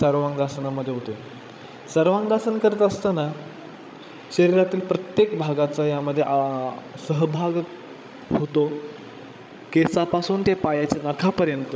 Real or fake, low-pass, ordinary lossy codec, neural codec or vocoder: fake; none; none; codec, 16 kHz, 16 kbps, FreqCodec, larger model